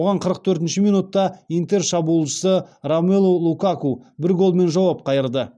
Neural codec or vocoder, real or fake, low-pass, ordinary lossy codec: none; real; none; none